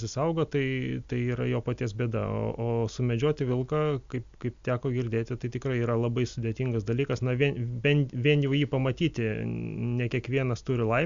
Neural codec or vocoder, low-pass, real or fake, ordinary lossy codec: none; 7.2 kHz; real; MP3, 64 kbps